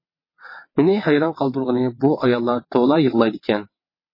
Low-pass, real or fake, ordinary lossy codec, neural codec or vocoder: 5.4 kHz; fake; MP3, 24 kbps; vocoder, 22.05 kHz, 80 mel bands, WaveNeXt